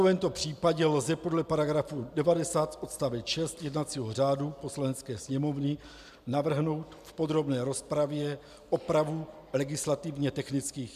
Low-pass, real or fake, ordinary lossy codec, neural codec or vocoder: 14.4 kHz; real; MP3, 96 kbps; none